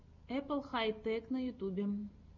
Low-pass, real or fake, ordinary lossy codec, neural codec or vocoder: 7.2 kHz; real; MP3, 64 kbps; none